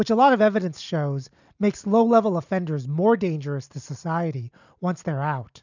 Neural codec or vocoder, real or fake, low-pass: none; real; 7.2 kHz